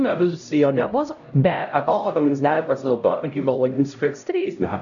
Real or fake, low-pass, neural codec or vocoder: fake; 7.2 kHz; codec, 16 kHz, 0.5 kbps, X-Codec, HuBERT features, trained on LibriSpeech